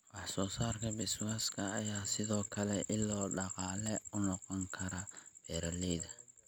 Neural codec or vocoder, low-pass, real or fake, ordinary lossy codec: none; none; real; none